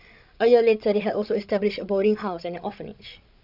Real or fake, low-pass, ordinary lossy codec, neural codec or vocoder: fake; 5.4 kHz; none; codec, 16 kHz, 16 kbps, FreqCodec, larger model